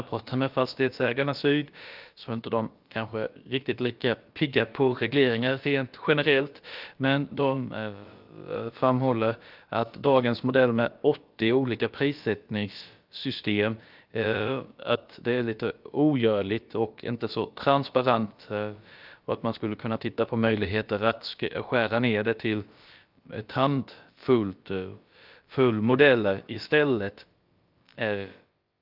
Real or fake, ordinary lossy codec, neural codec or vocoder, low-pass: fake; Opus, 24 kbps; codec, 16 kHz, about 1 kbps, DyCAST, with the encoder's durations; 5.4 kHz